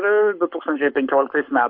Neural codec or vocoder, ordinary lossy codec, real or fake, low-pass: codec, 44.1 kHz, 7.8 kbps, Pupu-Codec; MP3, 48 kbps; fake; 5.4 kHz